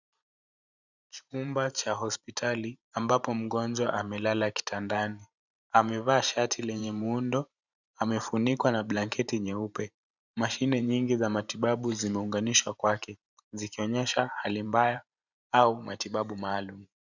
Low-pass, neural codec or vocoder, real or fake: 7.2 kHz; vocoder, 44.1 kHz, 128 mel bands every 512 samples, BigVGAN v2; fake